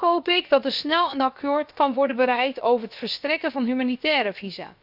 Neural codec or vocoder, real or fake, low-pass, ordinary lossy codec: codec, 16 kHz, about 1 kbps, DyCAST, with the encoder's durations; fake; 5.4 kHz; none